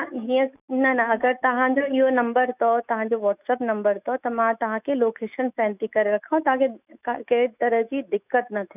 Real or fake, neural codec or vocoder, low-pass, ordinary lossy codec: fake; autoencoder, 48 kHz, 128 numbers a frame, DAC-VAE, trained on Japanese speech; 3.6 kHz; none